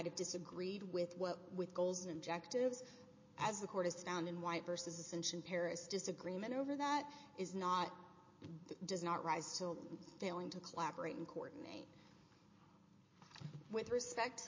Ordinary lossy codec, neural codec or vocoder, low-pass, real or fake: MP3, 32 kbps; none; 7.2 kHz; real